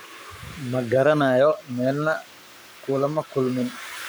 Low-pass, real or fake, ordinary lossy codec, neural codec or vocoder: none; fake; none; codec, 44.1 kHz, 7.8 kbps, Pupu-Codec